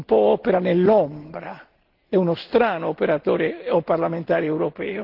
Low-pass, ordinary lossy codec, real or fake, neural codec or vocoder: 5.4 kHz; Opus, 16 kbps; real; none